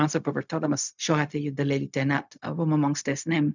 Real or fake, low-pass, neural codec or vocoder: fake; 7.2 kHz; codec, 16 kHz, 0.4 kbps, LongCat-Audio-Codec